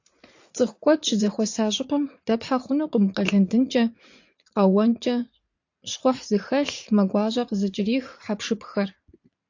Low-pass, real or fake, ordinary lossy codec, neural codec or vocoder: 7.2 kHz; fake; AAC, 48 kbps; vocoder, 44.1 kHz, 80 mel bands, Vocos